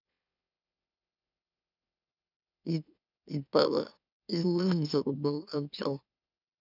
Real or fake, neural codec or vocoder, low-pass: fake; autoencoder, 44.1 kHz, a latent of 192 numbers a frame, MeloTTS; 5.4 kHz